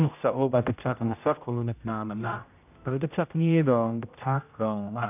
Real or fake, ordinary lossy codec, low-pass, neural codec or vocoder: fake; none; 3.6 kHz; codec, 16 kHz, 0.5 kbps, X-Codec, HuBERT features, trained on general audio